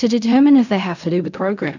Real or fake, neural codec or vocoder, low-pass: fake; codec, 16 kHz in and 24 kHz out, 0.4 kbps, LongCat-Audio-Codec, fine tuned four codebook decoder; 7.2 kHz